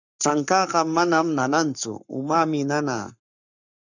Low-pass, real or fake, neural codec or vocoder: 7.2 kHz; fake; codec, 44.1 kHz, 7.8 kbps, Pupu-Codec